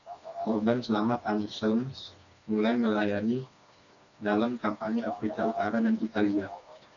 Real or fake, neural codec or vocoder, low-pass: fake; codec, 16 kHz, 2 kbps, FreqCodec, smaller model; 7.2 kHz